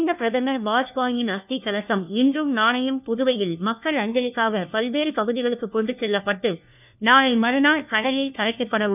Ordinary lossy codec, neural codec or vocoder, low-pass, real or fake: none; codec, 16 kHz, 1 kbps, FunCodec, trained on LibriTTS, 50 frames a second; 3.6 kHz; fake